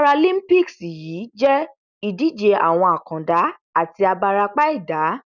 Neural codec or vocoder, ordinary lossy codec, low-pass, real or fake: none; none; 7.2 kHz; real